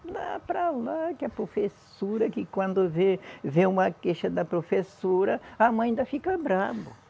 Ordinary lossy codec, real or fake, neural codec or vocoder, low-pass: none; real; none; none